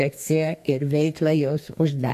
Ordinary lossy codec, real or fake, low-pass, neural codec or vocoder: AAC, 64 kbps; fake; 14.4 kHz; codec, 32 kHz, 1.9 kbps, SNAC